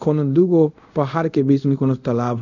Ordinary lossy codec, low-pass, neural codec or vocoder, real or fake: none; 7.2 kHz; codec, 24 kHz, 0.5 kbps, DualCodec; fake